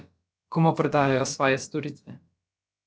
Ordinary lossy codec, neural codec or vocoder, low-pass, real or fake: none; codec, 16 kHz, about 1 kbps, DyCAST, with the encoder's durations; none; fake